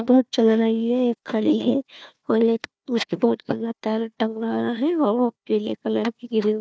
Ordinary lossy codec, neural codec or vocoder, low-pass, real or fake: none; codec, 16 kHz, 1 kbps, FunCodec, trained on Chinese and English, 50 frames a second; none; fake